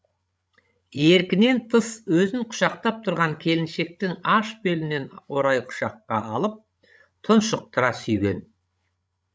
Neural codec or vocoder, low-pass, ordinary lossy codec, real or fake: codec, 16 kHz, 16 kbps, FreqCodec, larger model; none; none; fake